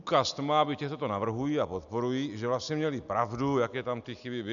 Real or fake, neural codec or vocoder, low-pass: real; none; 7.2 kHz